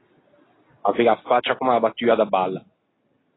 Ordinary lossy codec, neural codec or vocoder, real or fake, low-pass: AAC, 16 kbps; none; real; 7.2 kHz